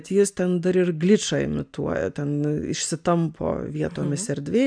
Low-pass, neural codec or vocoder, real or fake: 9.9 kHz; none; real